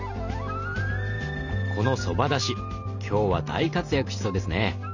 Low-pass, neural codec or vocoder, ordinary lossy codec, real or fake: 7.2 kHz; none; none; real